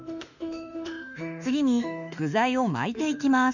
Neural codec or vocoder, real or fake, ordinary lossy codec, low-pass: autoencoder, 48 kHz, 32 numbers a frame, DAC-VAE, trained on Japanese speech; fake; none; 7.2 kHz